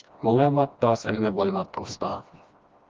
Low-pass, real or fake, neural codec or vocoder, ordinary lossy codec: 7.2 kHz; fake; codec, 16 kHz, 1 kbps, FreqCodec, smaller model; Opus, 32 kbps